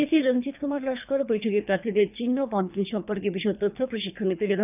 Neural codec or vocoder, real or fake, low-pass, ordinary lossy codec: codec, 24 kHz, 3 kbps, HILCodec; fake; 3.6 kHz; none